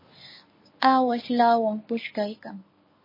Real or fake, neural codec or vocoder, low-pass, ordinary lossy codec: fake; codec, 24 kHz, 0.9 kbps, WavTokenizer, medium speech release version 1; 5.4 kHz; MP3, 24 kbps